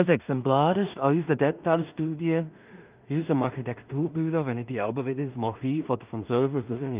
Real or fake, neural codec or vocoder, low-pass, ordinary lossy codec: fake; codec, 16 kHz in and 24 kHz out, 0.4 kbps, LongCat-Audio-Codec, two codebook decoder; 3.6 kHz; Opus, 24 kbps